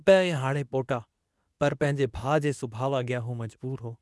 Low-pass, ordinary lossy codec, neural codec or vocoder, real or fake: none; none; codec, 24 kHz, 0.9 kbps, WavTokenizer, medium speech release version 2; fake